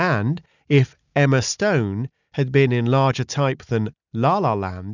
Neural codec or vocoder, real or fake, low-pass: none; real; 7.2 kHz